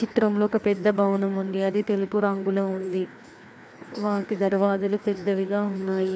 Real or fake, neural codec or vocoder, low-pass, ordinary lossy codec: fake; codec, 16 kHz, 2 kbps, FreqCodec, larger model; none; none